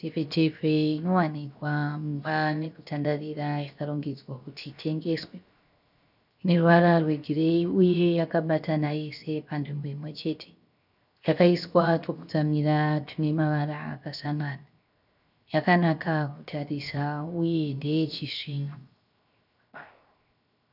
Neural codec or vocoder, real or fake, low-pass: codec, 16 kHz, 0.7 kbps, FocalCodec; fake; 5.4 kHz